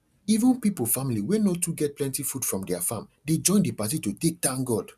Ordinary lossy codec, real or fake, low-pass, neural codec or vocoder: none; real; 14.4 kHz; none